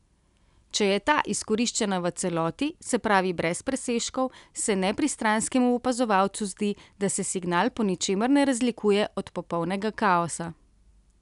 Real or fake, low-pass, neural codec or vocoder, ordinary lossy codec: real; 10.8 kHz; none; none